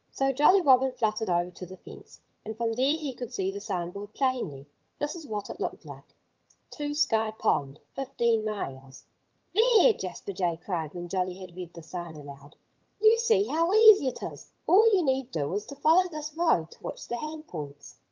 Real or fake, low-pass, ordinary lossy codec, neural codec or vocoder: fake; 7.2 kHz; Opus, 24 kbps; vocoder, 22.05 kHz, 80 mel bands, HiFi-GAN